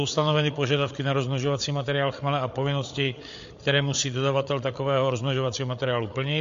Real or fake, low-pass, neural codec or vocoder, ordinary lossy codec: fake; 7.2 kHz; codec, 16 kHz, 8 kbps, FreqCodec, larger model; MP3, 48 kbps